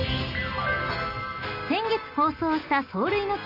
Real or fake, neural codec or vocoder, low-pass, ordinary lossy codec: real; none; 5.4 kHz; AAC, 32 kbps